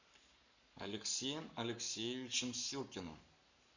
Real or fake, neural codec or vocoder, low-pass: fake; codec, 44.1 kHz, 7.8 kbps, Pupu-Codec; 7.2 kHz